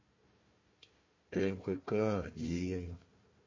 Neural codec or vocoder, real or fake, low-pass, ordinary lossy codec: codec, 16 kHz, 1 kbps, FunCodec, trained on Chinese and English, 50 frames a second; fake; 7.2 kHz; MP3, 32 kbps